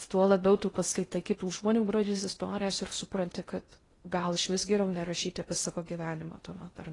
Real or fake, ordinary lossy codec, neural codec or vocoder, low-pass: fake; AAC, 32 kbps; codec, 16 kHz in and 24 kHz out, 0.6 kbps, FocalCodec, streaming, 2048 codes; 10.8 kHz